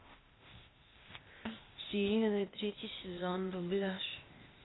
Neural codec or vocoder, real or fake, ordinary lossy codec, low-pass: codec, 16 kHz, 0.8 kbps, ZipCodec; fake; AAC, 16 kbps; 7.2 kHz